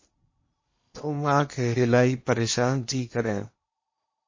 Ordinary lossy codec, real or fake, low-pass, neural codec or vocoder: MP3, 32 kbps; fake; 7.2 kHz; codec, 16 kHz in and 24 kHz out, 0.8 kbps, FocalCodec, streaming, 65536 codes